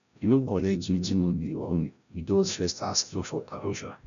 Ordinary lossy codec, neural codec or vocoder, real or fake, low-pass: none; codec, 16 kHz, 0.5 kbps, FreqCodec, larger model; fake; 7.2 kHz